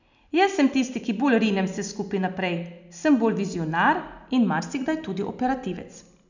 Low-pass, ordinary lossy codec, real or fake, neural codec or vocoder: 7.2 kHz; none; real; none